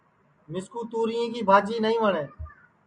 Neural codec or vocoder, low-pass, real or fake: none; 9.9 kHz; real